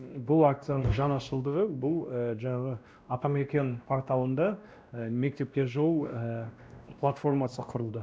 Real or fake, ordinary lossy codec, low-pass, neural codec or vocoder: fake; none; none; codec, 16 kHz, 1 kbps, X-Codec, WavLM features, trained on Multilingual LibriSpeech